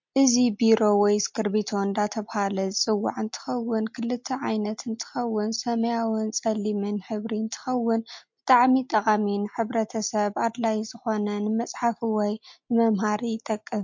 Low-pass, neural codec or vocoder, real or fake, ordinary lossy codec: 7.2 kHz; none; real; MP3, 48 kbps